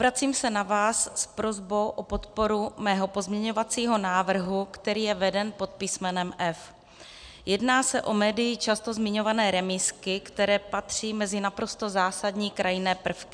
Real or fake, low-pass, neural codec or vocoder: real; 9.9 kHz; none